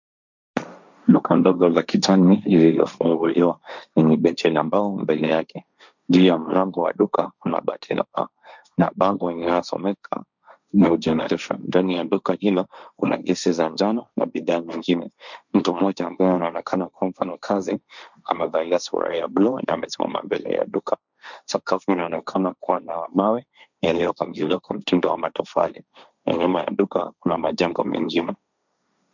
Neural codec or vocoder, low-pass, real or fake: codec, 16 kHz, 1.1 kbps, Voila-Tokenizer; 7.2 kHz; fake